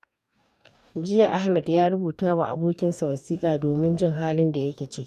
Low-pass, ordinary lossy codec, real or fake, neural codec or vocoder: 14.4 kHz; none; fake; codec, 44.1 kHz, 2.6 kbps, DAC